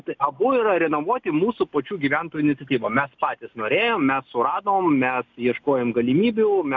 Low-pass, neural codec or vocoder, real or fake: 7.2 kHz; none; real